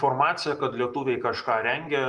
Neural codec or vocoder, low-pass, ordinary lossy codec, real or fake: none; 10.8 kHz; Opus, 32 kbps; real